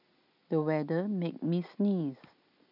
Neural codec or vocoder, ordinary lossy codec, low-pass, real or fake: none; none; 5.4 kHz; real